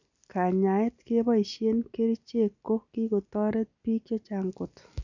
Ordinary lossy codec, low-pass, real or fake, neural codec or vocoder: none; 7.2 kHz; real; none